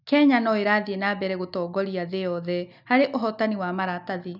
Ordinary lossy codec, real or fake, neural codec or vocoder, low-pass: none; real; none; 5.4 kHz